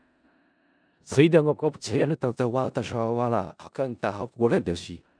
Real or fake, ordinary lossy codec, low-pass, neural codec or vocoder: fake; none; 9.9 kHz; codec, 16 kHz in and 24 kHz out, 0.4 kbps, LongCat-Audio-Codec, four codebook decoder